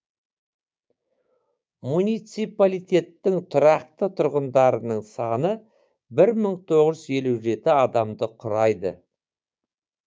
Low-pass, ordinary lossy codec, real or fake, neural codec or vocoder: none; none; fake; codec, 16 kHz, 6 kbps, DAC